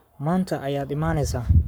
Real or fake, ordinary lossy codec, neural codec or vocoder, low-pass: fake; none; codec, 44.1 kHz, 7.8 kbps, Pupu-Codec; none